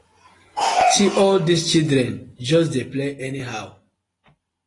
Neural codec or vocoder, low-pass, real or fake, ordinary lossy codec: none; 10.8 kHz; real; AAC, 32 kbps